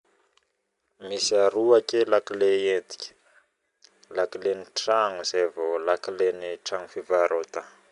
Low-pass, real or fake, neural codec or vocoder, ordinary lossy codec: 10.8 kHz; real; none; none